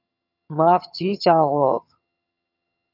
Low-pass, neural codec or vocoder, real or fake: 5.4 kHz; vocoder, 22.05 kHz, 80 mel bands, HiFi-GAN; fake